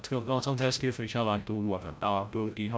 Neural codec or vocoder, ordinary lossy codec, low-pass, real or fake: codec, 16 kHz, 0.5 kbps, FreqCodec, larger model; none; none; fake